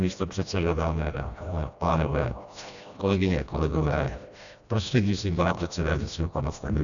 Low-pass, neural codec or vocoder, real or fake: 7.2 kHz; codec, 16 kHz, 1 kbps, FreqCodec, smaller model; fake